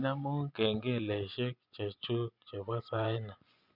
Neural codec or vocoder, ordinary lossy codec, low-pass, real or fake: vocoder, 24 kHz, 100 mel bands, Vocos; none; 5.4 kHz; fake